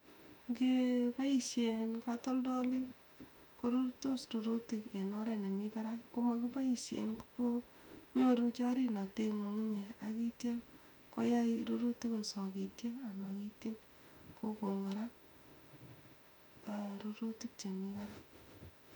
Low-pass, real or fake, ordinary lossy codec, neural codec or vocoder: 19.8 kHz; fake; none; autoencoder, 48 kHz, 32 numbers a frame, DAC-VAE, trained on Japanese speech